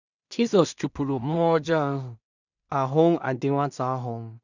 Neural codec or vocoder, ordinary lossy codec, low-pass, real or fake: codec, 16 kHz in and 24 kHz out, 0.4 kbps, LongCat-Audio-Codec, two codebook decoder; none; 7.2 kHz; fake